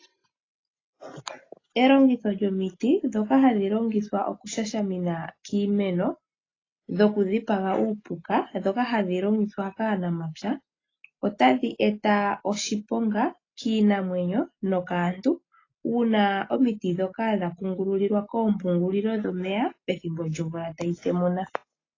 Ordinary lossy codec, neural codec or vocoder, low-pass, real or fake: AAC, 32 kbps; none; 7.2 kHz; real